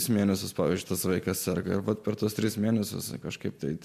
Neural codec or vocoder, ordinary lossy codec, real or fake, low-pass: none; MP3, 64 kbps; real; 14.4 kHz